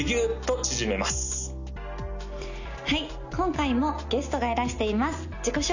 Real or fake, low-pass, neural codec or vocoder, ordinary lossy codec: real; 7.2 kHz; none; none